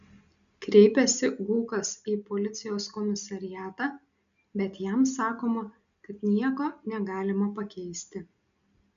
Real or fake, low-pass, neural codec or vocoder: real; 7.2 kHz; none